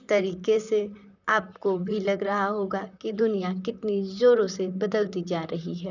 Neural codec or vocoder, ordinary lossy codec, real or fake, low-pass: vocoder, 44.1 kHz, 128 mel bands, Pupu-Vocoder; none; fake; 7.2 kHz